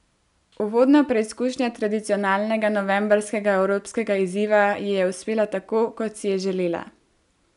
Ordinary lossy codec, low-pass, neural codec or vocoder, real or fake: none; 10.8 kHz; none; real